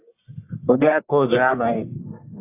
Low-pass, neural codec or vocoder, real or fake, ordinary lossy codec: 3.6 kHz; codec, 44.1 kHz, 1.7 kbps, Pupu-Codec; fake; AAC, 24 kbps